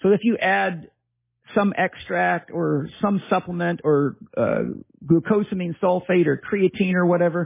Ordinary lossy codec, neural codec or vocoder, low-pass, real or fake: MP3, 16 kbps; none; 3.6 kHz; real